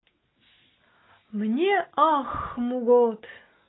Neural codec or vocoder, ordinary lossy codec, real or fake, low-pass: none; AAC, 16 kbps; real; 7.2 kHz